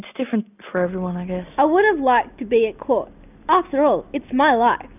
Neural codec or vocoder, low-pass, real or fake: none; 3.6 kHz; real